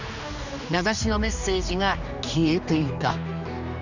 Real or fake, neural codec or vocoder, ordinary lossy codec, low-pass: fake; codec, 16 kHz, 2 kbps, X-Codec, HuBERT features, trained on general audio; none; 7.2 kHz